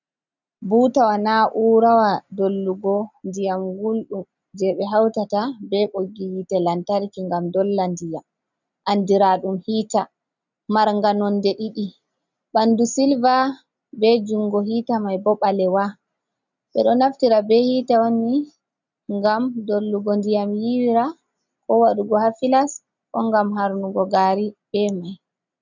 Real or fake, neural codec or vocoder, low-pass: real; none; 7.2 kHz